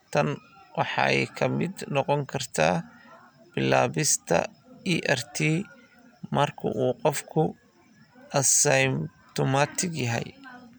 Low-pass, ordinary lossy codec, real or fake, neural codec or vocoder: none; none; real; none